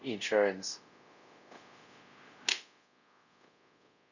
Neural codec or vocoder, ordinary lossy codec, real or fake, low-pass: codec, 24 kHz, 0.5 kbps, DualCodec; none; fake; 7.2 kHz